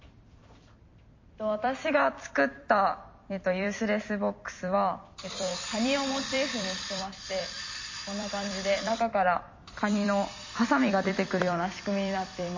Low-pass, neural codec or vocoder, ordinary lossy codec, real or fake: 7.2 kHz; vocoder, 44.1 kHz, 128 mel bands every 256 samples, BigVGAN v2; MP3, 32 kbps; fake